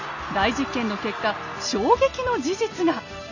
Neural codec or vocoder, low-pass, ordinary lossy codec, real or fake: none; 7.2 kHz; none; real